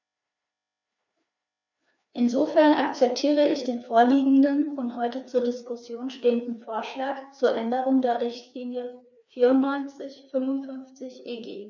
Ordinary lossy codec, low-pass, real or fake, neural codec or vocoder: none; 7.2 kHz; fake; codec, 16 kHz, 2 kbps, FreqCodec, larger model